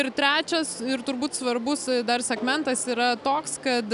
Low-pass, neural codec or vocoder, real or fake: 10.8 kHz; none; real